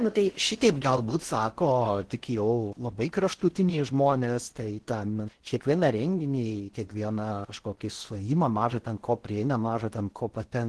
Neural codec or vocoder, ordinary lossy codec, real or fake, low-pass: codec, 16 kHz in and 24 kHz out, 0.6 kbps, FocalCodec, streaming, 4096 codes; Opus, 16 kbps; fake; 10.8 kHz